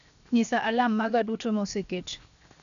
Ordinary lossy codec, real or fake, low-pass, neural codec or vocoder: MP3, 96 kbps; fake; 7.2 kHz; codec, 16 kHz, 0.7 kbps, FocalCodec